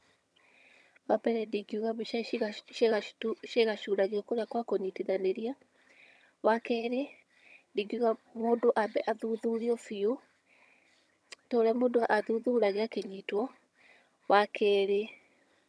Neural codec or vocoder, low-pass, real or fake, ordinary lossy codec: vocoder, 22.05 kHz, 80 mel bands, HiFi-GAN; none; fake; none